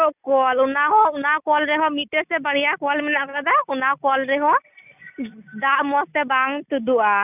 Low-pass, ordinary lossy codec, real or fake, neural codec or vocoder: 3.6 kHz; none; real; none